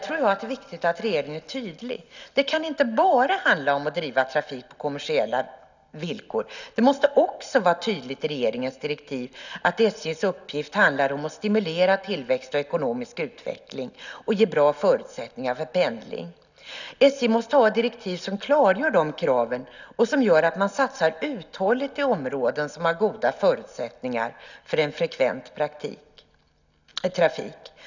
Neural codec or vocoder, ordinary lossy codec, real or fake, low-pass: none; none; real; 7.2 kHz